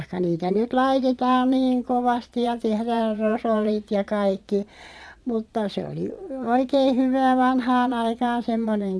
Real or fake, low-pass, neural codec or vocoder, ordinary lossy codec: fake; none; vocoder, 22.05 kHz, 80 mel bands, Vocos; none